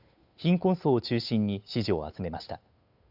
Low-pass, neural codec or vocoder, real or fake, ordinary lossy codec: 5.4 kHz; codec, 16 kHz, 8 kbps, FunCodec, trained on Chinese and English, 25 frames a second; fake; none